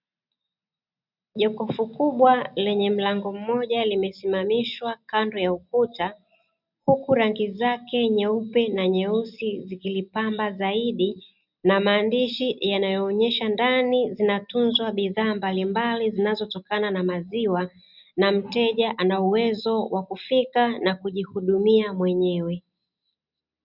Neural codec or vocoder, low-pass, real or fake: none; 5.4 kHz; real